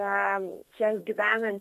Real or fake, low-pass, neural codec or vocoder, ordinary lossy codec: fake; 14.4 kHz; codec, 32 kHz, 1.9 kbps, SNAC; MP3, 64 kbps